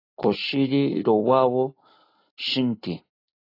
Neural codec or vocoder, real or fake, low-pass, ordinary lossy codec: none; real; 5.4 kHz; AAC, 32 kbps